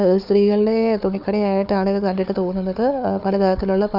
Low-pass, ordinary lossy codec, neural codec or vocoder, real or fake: 5.4 kHz; none; codec, 16 kHz, 4 kbps, FunCodec, trained on Chinese and English, 50 frames a second; fake